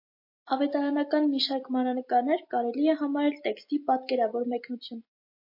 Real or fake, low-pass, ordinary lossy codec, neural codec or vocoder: real; 5.4 kHz; MP3, 32 kbps; none